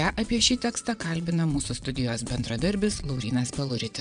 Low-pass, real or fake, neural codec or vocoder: 9.9 kHz; fake; vocoder, 22.05 kHz, 80 mel bands, WaveNeXt